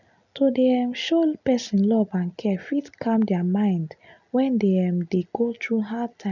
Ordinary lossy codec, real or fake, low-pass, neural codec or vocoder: none; real; 7.2 kHz; none